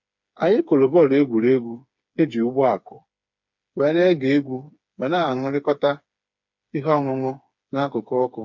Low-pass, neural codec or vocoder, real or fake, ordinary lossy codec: 7.2 kHz; codec, 16 kHz, 4 kbps, FreqCodec, smaller model; fake; MP3, 48 kbps